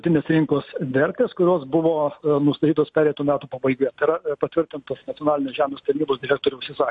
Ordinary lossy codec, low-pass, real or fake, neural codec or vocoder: MP3, 48 kbps; 10.8 kHz; real; none